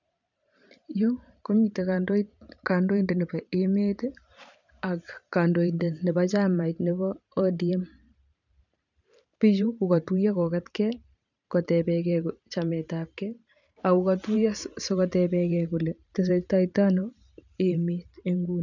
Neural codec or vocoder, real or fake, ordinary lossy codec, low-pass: vocoder, 44.1 kHz, 128 mel bands every 512 samples, BigVGAN v2; fake; none; 7.2 kHz